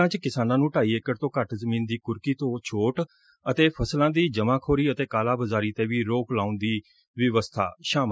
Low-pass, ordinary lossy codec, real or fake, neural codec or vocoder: none; none; real; none